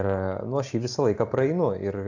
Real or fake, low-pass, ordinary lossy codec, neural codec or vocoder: real; 7.2 kHz; AAC, 48 kbps; none